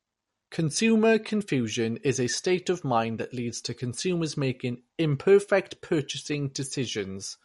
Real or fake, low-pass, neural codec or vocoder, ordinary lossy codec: real; 14.4 kHz; none; MP3, 48 kbps